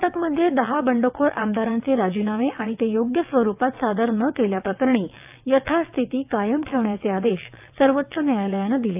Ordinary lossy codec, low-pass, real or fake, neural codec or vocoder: AAC, 32 kbps; 3.6 kHz; fake; vocoder, 22.05 kHz, 80 mel bands, WaveNeXt